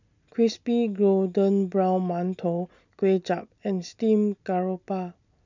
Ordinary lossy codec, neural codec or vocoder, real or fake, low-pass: none; none; real; 7.2 kHz